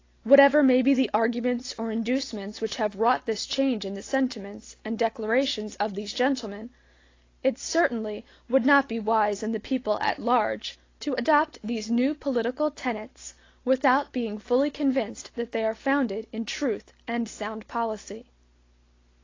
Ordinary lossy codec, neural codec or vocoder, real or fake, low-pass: AAC, 32 kbps; none; real; 7.2 kHz